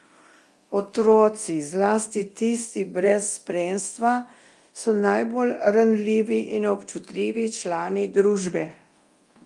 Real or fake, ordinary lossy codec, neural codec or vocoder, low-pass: fake; Opus, 32 kbps; codec, 24 kHz, 0.9 kbps, DualCodec; 10.8 kHz